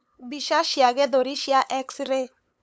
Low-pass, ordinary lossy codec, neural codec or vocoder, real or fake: none; none; codec, 16 kHz, 8 kbps, FunCodec, trained on LibriTTS, 25 frames a second; fake